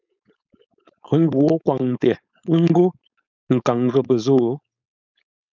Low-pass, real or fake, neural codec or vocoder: 7.2 kHz; fake; codec, 16 kHz, 4.8 kbps, FACodec